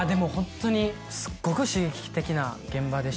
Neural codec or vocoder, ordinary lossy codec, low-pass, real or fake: none; none; none; real